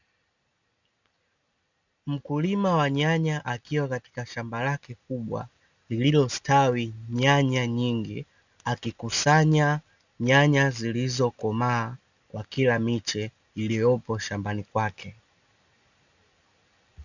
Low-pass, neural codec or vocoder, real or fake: 7.2 kHz; none; real